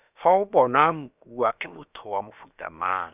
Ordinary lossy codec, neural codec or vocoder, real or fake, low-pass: none; codec, 16 kHz, about 1 kbps, DyCAST, with the encoder's durations; fake; 3.6 kHz